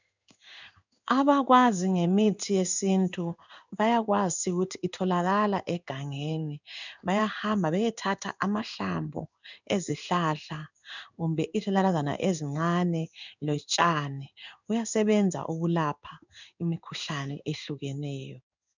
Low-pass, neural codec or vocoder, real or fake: 7.2 kHz; codec, 16 kHz in and 24 kHz out, 1 kbps, XY-Tokenizer; fake